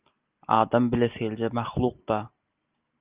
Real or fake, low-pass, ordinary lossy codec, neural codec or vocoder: real; 3.6 kHz; Opus, 24 kbps; none